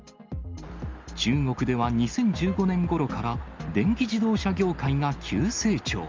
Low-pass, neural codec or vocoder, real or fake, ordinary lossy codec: 7.2 kHz; none; real; Opus, 32 kbps